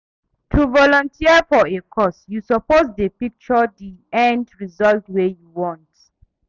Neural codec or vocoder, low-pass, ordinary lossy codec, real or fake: none; 7.2 kHz; none; real